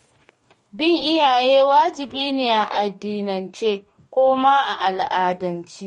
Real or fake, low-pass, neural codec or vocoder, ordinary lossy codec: fake; 19.8 kHz; codec, 44.1 kHz, 2.6 kbps, DAC; MP3, 48 kbps